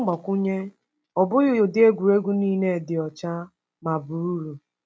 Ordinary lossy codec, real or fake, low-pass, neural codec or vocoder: none; real; none; none